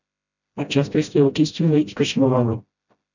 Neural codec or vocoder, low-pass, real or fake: codec, 16 kHz, 0.5 kbps, FreqCodec, smaller model; 7.2 kHz; fake